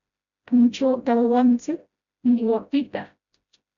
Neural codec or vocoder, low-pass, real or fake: codec, 16 kHz, 0.5 kbps, FreqCodec, smaller model; 7.2 kHz; fake